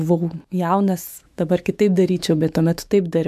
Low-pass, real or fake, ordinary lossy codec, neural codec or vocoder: 14.4 kHz; fake; MP3, 96 kbps; autoencoder, 48 kHz, 128 numbers a frame, DAC-VAE, trained on Japanese speech